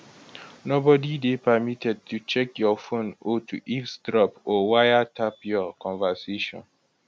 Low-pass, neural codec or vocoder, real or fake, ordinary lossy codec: none; none; real; none